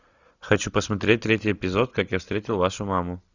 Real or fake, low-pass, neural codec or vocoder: real; 7.2 kHz; none